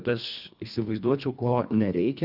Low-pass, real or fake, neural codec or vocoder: 5.4 kHz; fake; codec, 24 kHz, 1.5 kbps, HILCodec